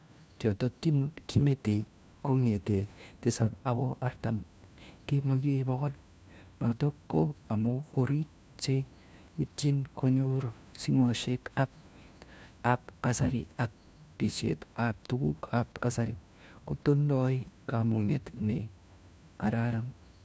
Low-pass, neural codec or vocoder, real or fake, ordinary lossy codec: none; codec, 16 kHz, 1 kbps, FunCodec, trained on LibriTTS, 50 frames a second; fake; none